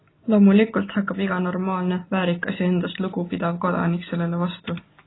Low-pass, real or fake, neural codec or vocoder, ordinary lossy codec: 7.2 kHz; real; none; AAC, 16 kbps